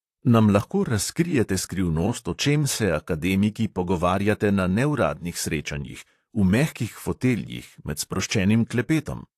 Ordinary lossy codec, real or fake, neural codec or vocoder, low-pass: AAC, 64 kbps; fake; vocoder, 44.1 kHz, 128 mel bands, Pupu-Vocoder; 14.4 kHz